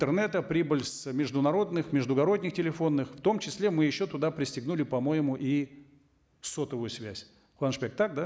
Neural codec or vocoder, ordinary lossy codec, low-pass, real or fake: none; none; none; real